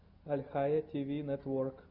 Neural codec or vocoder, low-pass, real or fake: none; 5.4 kHz; real